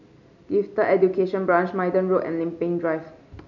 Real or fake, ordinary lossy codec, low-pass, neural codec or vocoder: real; none; 7.2 kHz; none